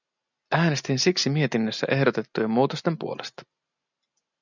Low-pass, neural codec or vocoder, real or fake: 7.2 kHz; none; real